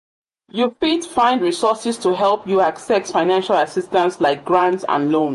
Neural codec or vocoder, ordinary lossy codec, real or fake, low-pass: vocoder, 48 kHz, 128 mel bands, Vocos; MP3, 48 kbps; fake; 14.4 kHz